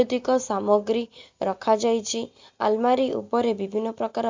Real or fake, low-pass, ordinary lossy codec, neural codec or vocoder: real; 7.2 kHz; MP3, 64 kbps; none